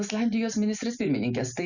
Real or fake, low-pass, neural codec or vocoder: real; 7.2 kHz; none